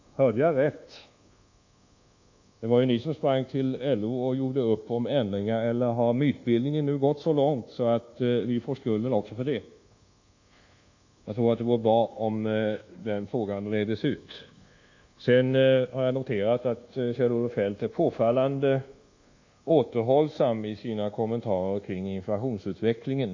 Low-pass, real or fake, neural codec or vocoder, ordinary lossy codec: 7.2 kHz; fake; codec, 24 kHz, 1.2 kbps, DualCodec; AAC, 48 kbps